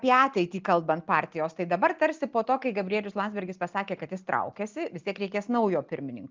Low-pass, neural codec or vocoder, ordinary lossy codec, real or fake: 7.2 kHz; none; Opus, 32 kbps; real